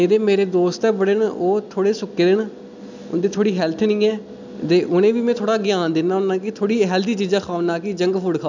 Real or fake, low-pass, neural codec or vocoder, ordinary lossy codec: real; 7.2 kHz; none; none